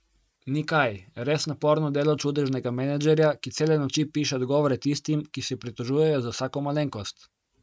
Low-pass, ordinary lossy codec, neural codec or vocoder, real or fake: none; none; none; real